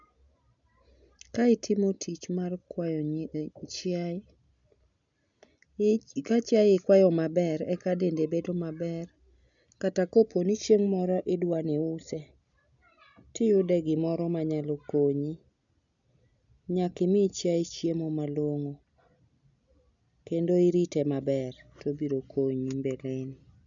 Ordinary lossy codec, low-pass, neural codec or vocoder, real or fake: none; 7.2 kHz; none; real